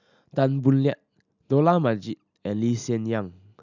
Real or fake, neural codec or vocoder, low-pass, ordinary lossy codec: real; none; 7.2 kHz; none